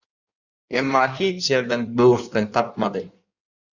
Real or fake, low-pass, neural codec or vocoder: fake; 7.2 kHz; codec, 16 kHz in and 24 kHz out, 1.1 kbps, FireRedTTS-2 codec